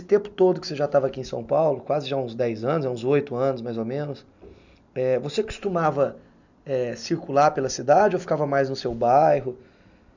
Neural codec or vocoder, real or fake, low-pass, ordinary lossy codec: none; real; 7.2 kHz; none